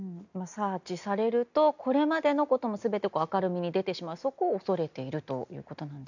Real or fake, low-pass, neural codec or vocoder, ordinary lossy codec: real; 7.2 kHz; none; none